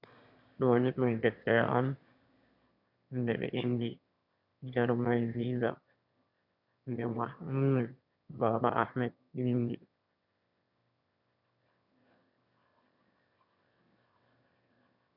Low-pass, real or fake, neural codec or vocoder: 5.4 kHz; fake; autoencoder, 22.05 kHz, a latent of 192 numbers a frame, VITS, trained on one speaker